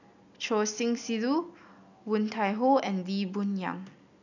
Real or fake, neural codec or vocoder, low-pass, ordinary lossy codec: real; none; 7.2 kHz; none